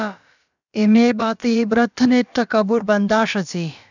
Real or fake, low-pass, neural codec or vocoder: fake; 7.2 kHz; codec, 16 kHz, about 1 kbps, DyCAST, with the encoder's durations